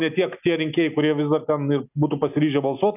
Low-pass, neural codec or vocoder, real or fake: 3.6 kHz; none; real